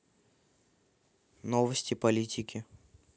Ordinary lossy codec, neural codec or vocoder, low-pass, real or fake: none; none; none; real